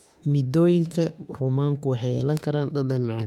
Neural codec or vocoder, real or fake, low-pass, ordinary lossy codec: autoencoder, 48 kHz, 32 numbers a frame, DAC-VAE, trained on Japanese speech; fake; 19.8 kHz; none